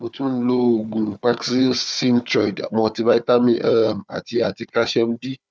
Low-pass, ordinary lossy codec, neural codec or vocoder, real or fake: none; none; codec, 16 kHz, 4 kbps, FunCodec, trained on Chinese and English, 50 frames a second; fake